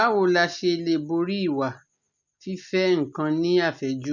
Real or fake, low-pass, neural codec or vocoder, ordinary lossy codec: real; 7.2 kHz; none; none